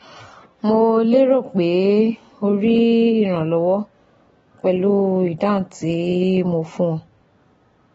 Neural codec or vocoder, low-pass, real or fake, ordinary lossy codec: none; 19.8 kHz; real; AAC, 24 kbps